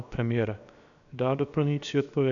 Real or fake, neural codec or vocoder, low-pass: fake; codec, 16 kHz, 0.7 kbps, FocalCodec; 7.2 kHz